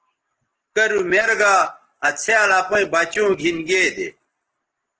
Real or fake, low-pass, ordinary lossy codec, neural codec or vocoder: real; 7.2 kHz; Opus, 16 kbps; none